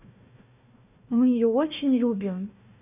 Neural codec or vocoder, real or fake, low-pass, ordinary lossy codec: codec, 16 kHz, 1 kbps, FunCodec, trained on Chinese and English, 50 frames a second; fake; 3.6 kHz; none